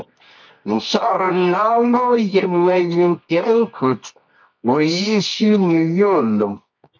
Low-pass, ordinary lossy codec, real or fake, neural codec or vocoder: 7.2 kHz; MP3, 64 kbps; fake; codec, 24 kHz, 0.9 kbps, WavTokenizer, medium music audio release